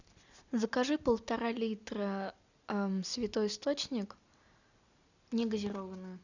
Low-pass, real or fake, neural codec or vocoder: 7.2 kHz; real; none